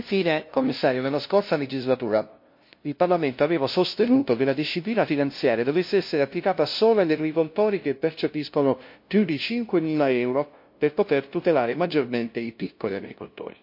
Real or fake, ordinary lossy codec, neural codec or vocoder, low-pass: fake; MP3, 32 kbps; codec, 16 kHz, 0.5 kbps, FunCodec, trained on LibriTTS, 25 frames a second; 5.4 kHz